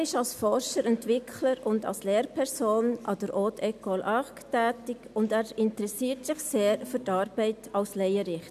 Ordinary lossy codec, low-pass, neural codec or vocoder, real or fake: none; 14.4 kHz; none; real